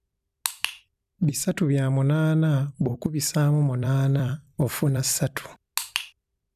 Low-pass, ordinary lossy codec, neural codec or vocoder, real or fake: 14.4 kHz; none; vocoder, 44.1 kHz, 128 mel bands every 256 samples, BigVGAN v2; fake